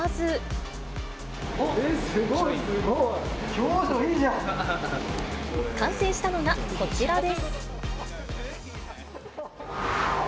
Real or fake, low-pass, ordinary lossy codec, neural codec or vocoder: real; none; none; none